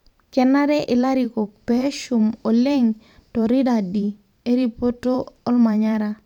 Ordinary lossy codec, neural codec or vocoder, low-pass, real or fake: none; vocoder, 44.1 kHz, 128 mel bands every 512 samples, BigVGAN v2; 19.8 kHz; fake